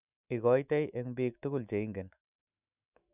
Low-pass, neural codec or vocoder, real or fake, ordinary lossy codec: 3.6 kHz; autoencoder, 48 kHz, 128 numbers a frame, DAC-VAE, trained on Japanese speech; fake; none